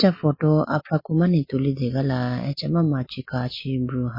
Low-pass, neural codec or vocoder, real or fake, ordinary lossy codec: 5.4 kHz; none; real; MP3, 24 kbps